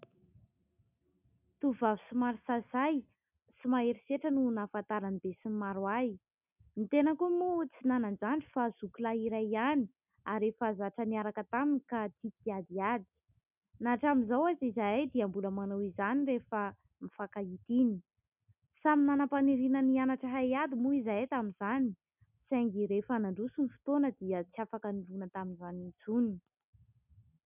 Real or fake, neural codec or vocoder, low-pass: real; none; 3.6 kHz